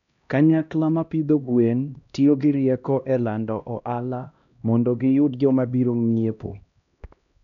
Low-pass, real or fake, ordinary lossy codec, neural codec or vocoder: 7.2 kHz; fake; none; codec, 16 kHz, 1 kbps, X-Codec, HuBERT features, trained on LibriSpeech